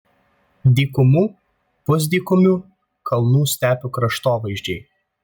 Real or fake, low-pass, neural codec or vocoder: real; 19.8 kHz; none